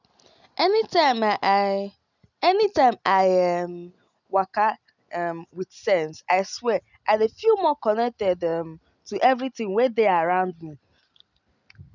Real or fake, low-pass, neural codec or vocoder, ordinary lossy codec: real; 7.2 kHz; none; none